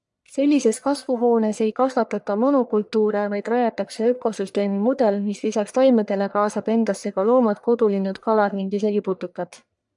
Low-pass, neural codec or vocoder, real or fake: 10.8 kHz; codec, 44.1 kHz, 1.7 kbps, Pupu-Codec; fake